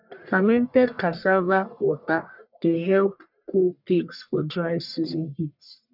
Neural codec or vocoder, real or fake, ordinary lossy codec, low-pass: codec, 44.1 kHz, 1.7 kbps, Pupu-Codec; fake; none; 5.4 kHz